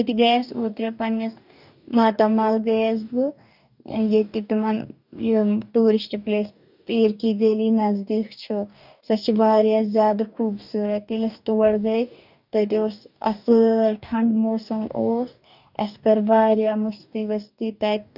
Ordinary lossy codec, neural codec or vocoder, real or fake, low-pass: none; codec, 44.1 kHz, 2.6 kbps, DAC; fake; 5.4 kHz